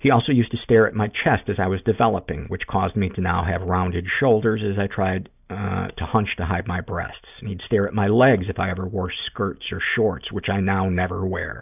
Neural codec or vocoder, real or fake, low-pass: none; real; 3.6 kHz